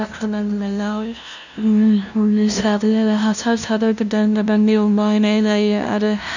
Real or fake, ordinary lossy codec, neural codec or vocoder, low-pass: fake; none; codec, 16 kHz, 0.5 kbps, FunCodec, trained on LibriTTS, 25 frames a second; 7.2 kHz